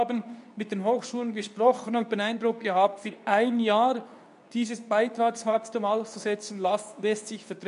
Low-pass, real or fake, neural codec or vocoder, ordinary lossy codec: 10.8 kHz; fake; codec, 24 kHz, 0.9 kbps, WavTokenizer, medium speech release version 2; none